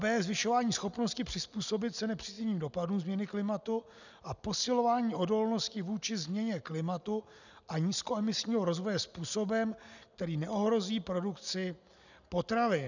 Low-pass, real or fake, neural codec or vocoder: 7.2 kHz; real; none